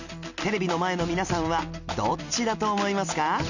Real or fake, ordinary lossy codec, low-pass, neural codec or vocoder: real; none; 7.2 kHz; none